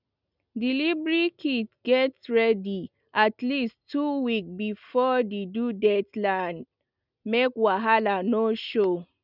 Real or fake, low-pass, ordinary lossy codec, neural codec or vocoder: real; 5.4 kHz; none; none